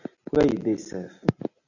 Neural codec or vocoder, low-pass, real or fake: none; 7.2 kHz; real